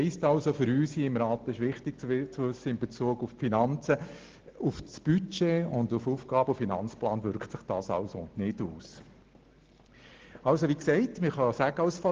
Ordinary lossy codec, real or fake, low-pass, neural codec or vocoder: Opus, 16 kbps; real; 7.2 kHz; none